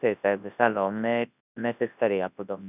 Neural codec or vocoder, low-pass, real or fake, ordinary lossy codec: codec, 24 kHz, 0.9 kbps, WavTokenizer, large speech release; 3.6 kHz; fake; none